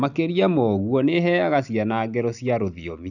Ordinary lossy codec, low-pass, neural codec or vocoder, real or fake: none; 7.2 kHz; none; real